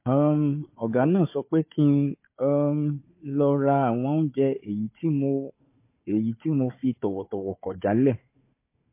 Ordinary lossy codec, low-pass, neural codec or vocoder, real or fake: MP3, 24 kbps; 3.6 kHz; codec, 16 kHz, 4 kbps, FunCodec, trained on Chinese and English, 50 frames a second; fake